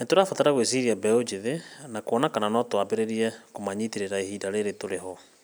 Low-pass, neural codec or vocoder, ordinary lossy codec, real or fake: none; none; none; real